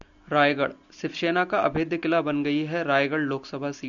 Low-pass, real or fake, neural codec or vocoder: 7.2 kHz; real; none